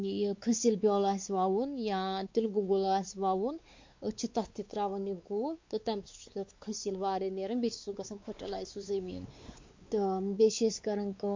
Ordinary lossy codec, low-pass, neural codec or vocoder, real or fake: MP3, 48 kbps; 7.2 kHz; codec, 16 kHz, 4 kbps, X-Codec, WavLM features, trained on Multilingual LibriSpeech; fake